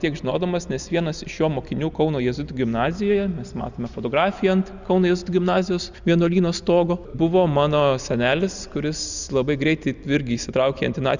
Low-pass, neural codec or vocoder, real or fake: 7.2 kHz; none; real